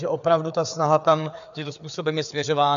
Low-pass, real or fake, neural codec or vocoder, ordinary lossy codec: 7.2 kHz; fake; codec, 16 kHz, 4 kbps, FreqCodec, larger model; AAC, 96 kbps